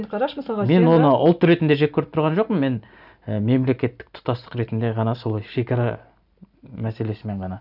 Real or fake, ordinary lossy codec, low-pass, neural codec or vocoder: real; none; 5.4 kHz; none